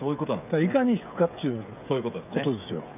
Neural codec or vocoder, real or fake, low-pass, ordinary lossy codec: codec, 16 kHz, 16 kbps, FreqCodec, smaller model; fake; 3.6 kHz; none